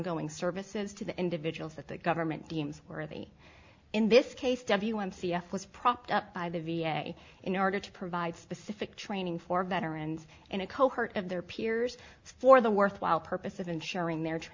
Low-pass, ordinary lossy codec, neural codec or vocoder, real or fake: 7.2 kHz; MP3, 64 kbps; none; real